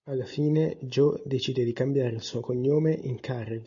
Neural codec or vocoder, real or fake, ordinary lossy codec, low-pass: none; real; AAC, 64 kbps; 7.2 kHz